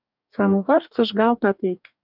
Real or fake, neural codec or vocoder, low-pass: fake; codec, 44.1 kHz, 2.6 kbps, DAC; 5.4 kHz